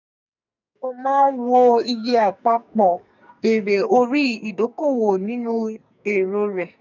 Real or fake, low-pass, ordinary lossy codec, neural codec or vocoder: fake; 7.2 kHz; none; codec, 44.1 kHz, 2.6 kbps, SNAC